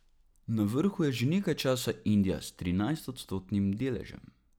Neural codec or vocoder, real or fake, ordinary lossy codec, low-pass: none; real; none; 19.8 kHz